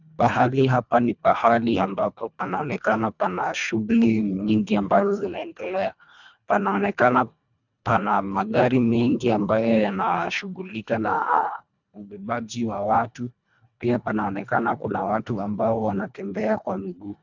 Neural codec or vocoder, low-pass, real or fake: codec, 24 kHz, 1.5 kbps, HILCodec; 7.2 kHz; fake